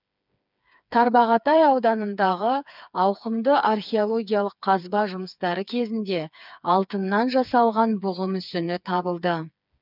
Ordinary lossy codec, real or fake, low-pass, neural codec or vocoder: none; fake; 5.4 kHz; codec, 16 kHz, 4 kbps, FreqCodec, smaller model